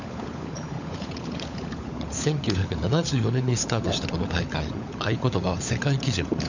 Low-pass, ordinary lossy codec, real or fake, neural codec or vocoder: 7.2 kHz; none; fake; codec, 16 kHz, 16 kbps, FunCodec, trained on LibriTTS, 50 frames a second